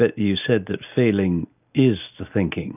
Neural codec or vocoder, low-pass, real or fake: none; 3.6 kHz; real